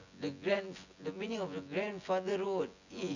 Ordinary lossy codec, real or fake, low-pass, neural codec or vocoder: none; fake; 7.2 kHz; vocoder, 24 kHz, 100 mel bands, Vocos